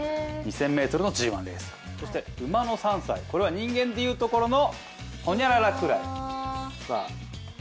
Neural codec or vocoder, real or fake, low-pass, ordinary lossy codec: none; real; none; none